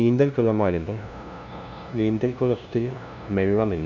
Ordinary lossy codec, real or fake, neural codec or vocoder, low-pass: none; fake; codec, 16 kHz, 0.5 kbps, FunCodec, trained on LibriTTS, 25 frames a second; 7.2 kHz